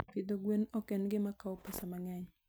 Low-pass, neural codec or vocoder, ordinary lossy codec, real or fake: none; none; none; real